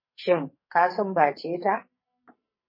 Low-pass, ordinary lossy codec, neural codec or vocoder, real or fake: 5.4 kHz; MP3, 24 kbps; codec, 44.1 kHz, 2.6 kbps, SNAC; fake